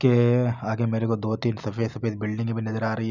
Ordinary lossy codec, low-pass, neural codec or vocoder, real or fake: none; 7.2 kHz; none; real